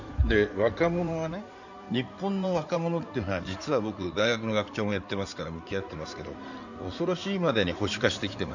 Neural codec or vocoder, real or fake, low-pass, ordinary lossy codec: codec, 16 kHz in and 24 kHz out, 2.2 kbps, FireRedTTS-2 codec; fake; 7.2 kHz; none